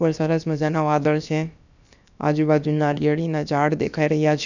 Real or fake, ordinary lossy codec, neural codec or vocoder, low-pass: fake; none; codec, 16 kHz, about 1 kbps, DyCAST, with the encoder's durations; 7.2 kHz